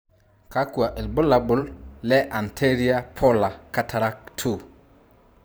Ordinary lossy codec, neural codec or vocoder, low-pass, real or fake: none; vocoder, 44.1 kHz, 128 mel bands every 512 samples, BigVGAN v2; none; fake